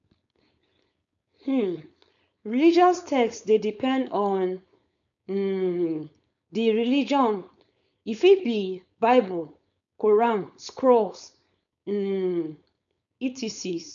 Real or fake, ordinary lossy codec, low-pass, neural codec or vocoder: fake; none; 7.2 kHz; codec, 16 kHz, 4.8 kbps, FACodec